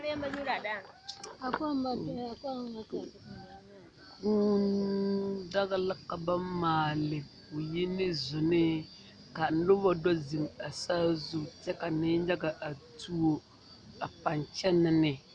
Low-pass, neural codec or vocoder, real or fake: 9.9 kHz; none; real